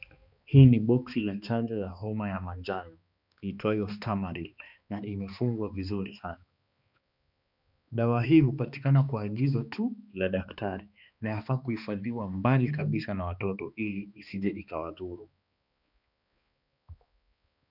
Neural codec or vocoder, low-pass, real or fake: codec, 16 kHz, 2 kbps, X-Codec, HuBERT features, trained on balanced general audio; 5.4 kHz; fake